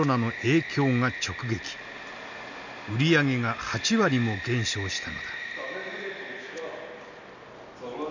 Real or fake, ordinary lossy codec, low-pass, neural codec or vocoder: real; none; 7.2 kHz; none